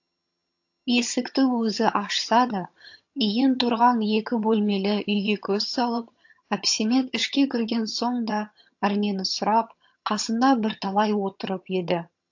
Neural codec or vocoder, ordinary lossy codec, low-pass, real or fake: vocoder, 22.05 kHz, 80 mel bands, HiFi-GAN; AAC, 48 kbps; 7.2 kHz; fake